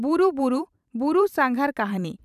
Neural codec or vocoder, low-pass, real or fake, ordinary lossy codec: vocoder, 44.1 kHz, 128 mel bands every 512 samples, BigVGAN v2; 14.4 kHz; fake; none